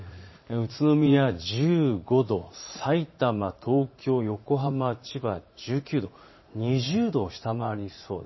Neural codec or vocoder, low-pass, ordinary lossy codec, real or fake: vocoder, 44.1 kHz, 80 mel bands, Vocos; 7.2 kHz; MP3, 24 kbps; fake